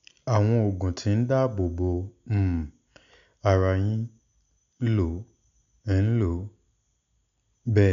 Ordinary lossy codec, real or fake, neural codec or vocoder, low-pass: none; real; none; 7.2 kHz